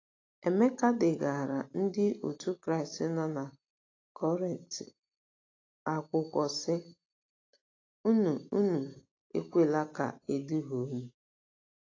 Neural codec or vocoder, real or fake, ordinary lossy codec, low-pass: none; real; AAC, 32 kbps; 7.2 kHz